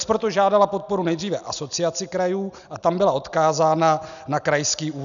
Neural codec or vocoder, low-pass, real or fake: none; 7.2 kHz; real